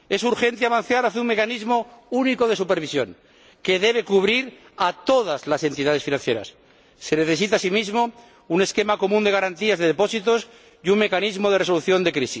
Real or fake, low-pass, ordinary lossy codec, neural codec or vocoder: real; none; none; none